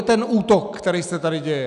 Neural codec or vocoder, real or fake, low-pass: none; real; 10.8 kHz